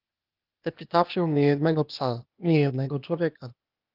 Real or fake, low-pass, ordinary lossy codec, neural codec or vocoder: fake; 5.4 kHz; Opus, 32 kbps; codec, 16 kHz, 0.8 kbps, ZipCodec